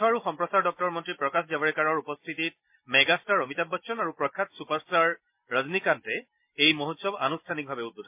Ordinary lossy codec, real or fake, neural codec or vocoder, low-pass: MP3, 32 kbps; real; none; 3.6 kHz